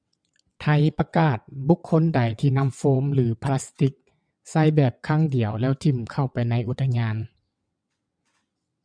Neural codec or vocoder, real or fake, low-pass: vocoder, 22.05 kHz, 80 mel bands, WaveNeXt; fake; 9.9 kHz